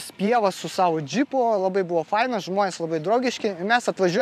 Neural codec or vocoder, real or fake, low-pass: vocoder, 44.1 kHz, 128 mel bands every 512 samples, BigVGAN v2; fake; 14.4 kHz